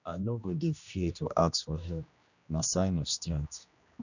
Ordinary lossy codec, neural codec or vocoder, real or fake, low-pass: none; codec, 16 kHz, 1 kbps, X-Codec, HuBERT features, trained on general audio; fake; 7.2 kHz